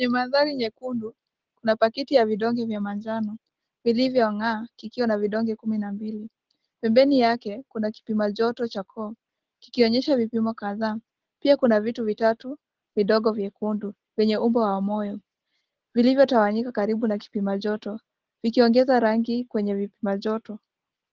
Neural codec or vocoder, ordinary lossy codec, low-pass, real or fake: none; Opus, 16 kbps; 7.2 kHz; real